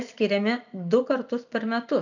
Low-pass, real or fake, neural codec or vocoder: 7.2 kHz; real; none